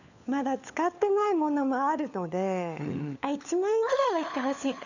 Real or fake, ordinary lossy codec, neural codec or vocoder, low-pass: fake; none; codec, 16 kHz, 4 kbps, FunCodec, trained on LibriTTS, 50 frames a second; 7.2 kHz